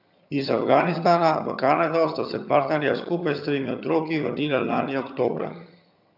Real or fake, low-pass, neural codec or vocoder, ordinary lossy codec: fake; 5.4 kHz; vocoder, 22.05 kHz, 80 mel bands, HiFi-GAN; none